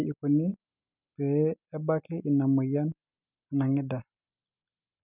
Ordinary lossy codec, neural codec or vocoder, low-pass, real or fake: none; none; 3.6 kHz; real